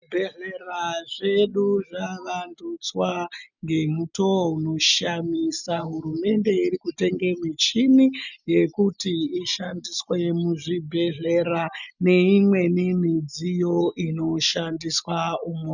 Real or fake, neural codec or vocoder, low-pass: real; none; 7.2 kHz